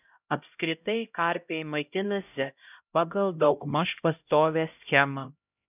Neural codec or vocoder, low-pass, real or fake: codec, 16 kHz, 0.5 kbps, X-Codec, HuBERT features, trained on LibriSpeech; 3.6 kHz; fake